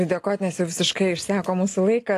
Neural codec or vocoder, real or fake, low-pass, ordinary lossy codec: none; real; 14.4 kHz; AAC, 48 kbps